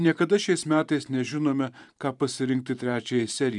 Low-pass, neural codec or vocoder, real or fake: 10.8 kHz; vocoder, 44.1 kHz, 128 mel bands every 512 samples, BigVGAN v2; fake